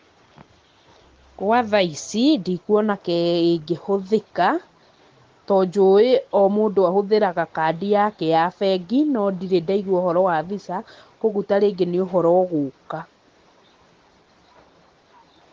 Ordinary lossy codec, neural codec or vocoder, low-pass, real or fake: Opus, 16 kbps; none; 7.2 kHz; real